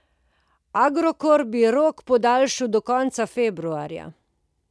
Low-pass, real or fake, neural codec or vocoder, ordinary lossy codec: none; real; none; none